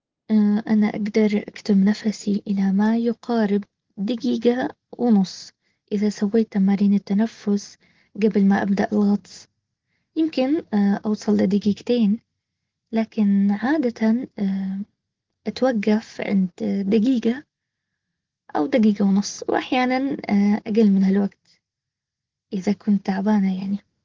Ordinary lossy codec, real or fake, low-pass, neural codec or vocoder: Opus, 16 kbps; real; 7.2 kHz; none